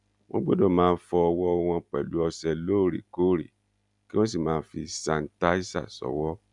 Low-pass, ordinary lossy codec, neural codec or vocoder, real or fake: 10.8 kHz; none; none; real